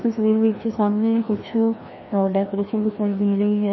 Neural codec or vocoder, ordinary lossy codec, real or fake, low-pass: codec, 16 kHz, 1 kbps, FreqCodec, larger model; MP3, 24 kbps; fake; 7.2 kHz